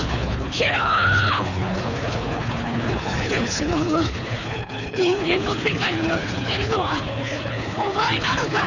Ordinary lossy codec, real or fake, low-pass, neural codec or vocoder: none; fake; 7.2 kHz; codec, 24 kHz, 3 kbps, HILCodec